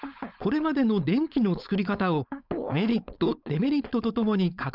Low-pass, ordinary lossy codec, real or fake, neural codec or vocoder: 5.4 kHz; none; fake; codec, 16 kHz, 4.8 kbps, FACodec